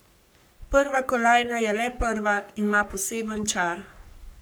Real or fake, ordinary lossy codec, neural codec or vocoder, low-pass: fake; none; codec, 44.1 kHz, 3.4 kbps, Pupu-Codec; none